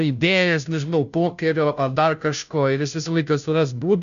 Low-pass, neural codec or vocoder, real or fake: 7.2 kHz; codec, 16 kHz, 0.5 kbps, FunCodec, trained on Chinese and English, 25 frames a second; fake